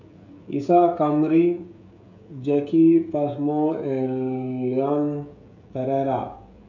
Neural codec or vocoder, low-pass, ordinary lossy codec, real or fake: codec, 16 kHz, 16 kbps, FreqCodec, smaller model; 7.2 kHz; none; fake